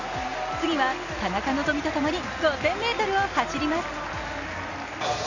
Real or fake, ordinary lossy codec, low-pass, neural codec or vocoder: real; none; 7.2 kHz; none